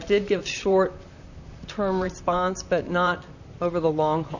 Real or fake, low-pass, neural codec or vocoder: fake; 7.2 kHz; vocoder, 44.1 kHz, 128 mel bands, Pupu-Vocoder